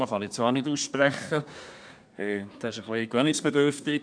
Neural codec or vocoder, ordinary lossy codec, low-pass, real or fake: codec, 24 kHz, 1 kbps, SNAC; none; 9.9 kHz; fake